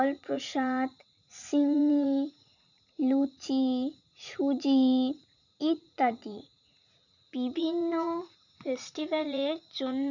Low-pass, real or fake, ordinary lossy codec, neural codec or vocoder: 7.2 kHz; fake; none; vocoder, 44.1 kHz, 80 mel bands, Vocos